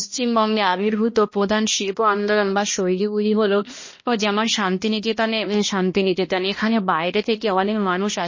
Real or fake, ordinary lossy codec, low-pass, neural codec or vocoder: fake; MP3, 32 kbps; 7.2 kHz; codec, 16 kHz, 1 kbps, X-Codec, HuBERT features, trained on balanced general audio